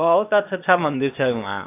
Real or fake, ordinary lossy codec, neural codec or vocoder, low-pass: fake; AAC, 24 kbps; codec, 16 kHz, 0.8 kbps, ZipCodec; 3.6 kHz